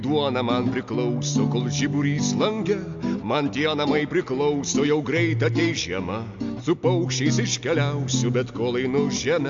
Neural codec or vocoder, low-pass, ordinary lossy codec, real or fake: none; 7.2 kHz; AAC, 64 kbps; real